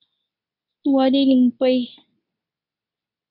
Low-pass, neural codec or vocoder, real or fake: 5.4 kHz; codec, 24 kHz, 0.9 kbps, WavTokenizer, medium speech release version 2; fake